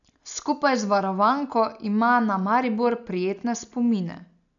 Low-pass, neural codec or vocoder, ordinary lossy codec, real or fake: 7.2 kHz; none; none; real